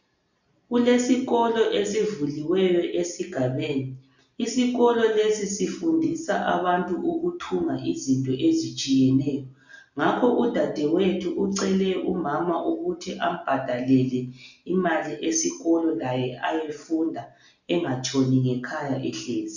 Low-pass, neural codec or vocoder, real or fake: 7.2 kHz; none; real